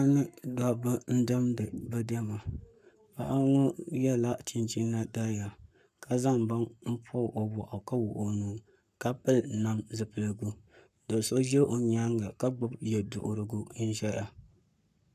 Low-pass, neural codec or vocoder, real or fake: 14.4 kHz; codec, 44.1 kHz, 7.8 kbps, Pupu-Codec; fake